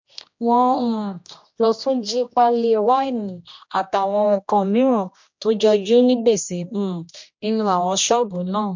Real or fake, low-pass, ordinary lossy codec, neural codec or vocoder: fake; 7.2 kHz; MP3, 48 kbps; codec, 16 kHz, 1 kbps, X-Codec, HuBERT features, trained on general audio